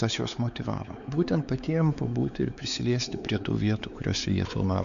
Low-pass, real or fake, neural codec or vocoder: 7.2 kHz; fake; codec, 16 kHz, 4 kbps, X-Codec, WavLM features, trained on Multilingual LibriSpeech